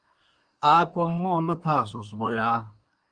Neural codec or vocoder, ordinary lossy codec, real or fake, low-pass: codec, 24 kHz, 1 kbps, SNAC; Opus, 32 kbps; fake; 9.9 kHz